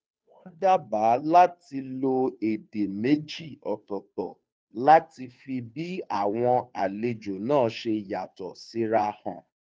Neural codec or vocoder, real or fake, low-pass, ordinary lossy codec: codec, 16 kHz, 2 kbps, FunCodec, trained on Chinese and English, 25 frames a second; fake; none; none